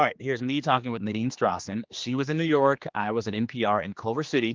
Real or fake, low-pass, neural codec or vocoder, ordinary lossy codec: fake; 7.2 kHz; codec, 16 kHz, 2 kbps, X-Codec, HuBERT features, trained on general audio; Opus, 32 kbps